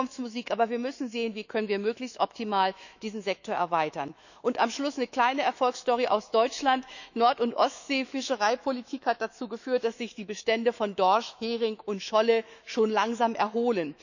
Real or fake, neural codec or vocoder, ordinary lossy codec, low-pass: fake; autoencoder, 48 kHz, 128 numbers a frame, DAC-VAE, trained on Japanese speech; none; 7.2 kHz